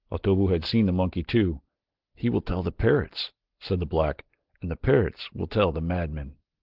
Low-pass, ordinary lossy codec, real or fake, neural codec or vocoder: 5.4 kHz; Opus, 16 kbps; real; none